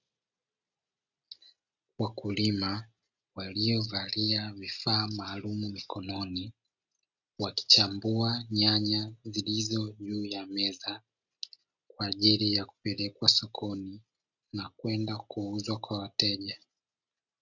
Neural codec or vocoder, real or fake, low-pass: none; real; 7.2 kHz